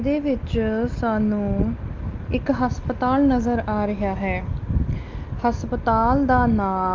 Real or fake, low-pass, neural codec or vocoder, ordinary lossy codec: real; 7.2 kHz; none; Opus, 24 kbps